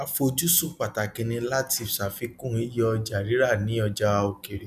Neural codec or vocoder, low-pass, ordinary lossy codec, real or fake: none; 14.4 kHz; none; real